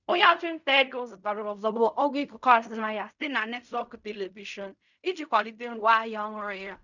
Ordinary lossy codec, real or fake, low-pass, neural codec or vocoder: none; fake; 7.2 kHz; codec, 16 kHz in and 24 kHz out, 0.4 kbps, LongCat-Audio-Codec, fine tuned four codebook decoder